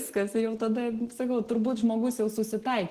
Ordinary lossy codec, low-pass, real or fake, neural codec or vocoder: Opus, 16 kbps; 14.4 kHz; real; none